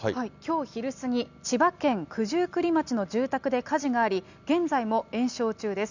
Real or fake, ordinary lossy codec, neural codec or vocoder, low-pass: real; none; none; 7.2 kHz